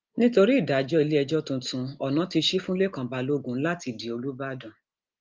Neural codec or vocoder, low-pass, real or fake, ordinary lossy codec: none; 7.2 kHz; real; Opus, 24 kbps